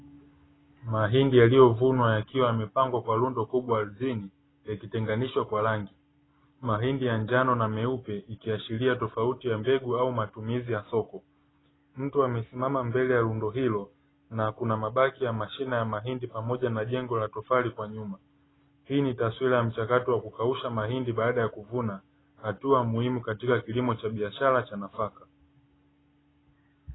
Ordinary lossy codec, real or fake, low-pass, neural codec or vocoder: AAC, 16 kbps; real; 7.2 kHz; none